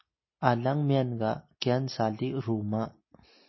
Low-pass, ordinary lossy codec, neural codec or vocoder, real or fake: 7.2 kHz; MP3, 24 kbps; none; real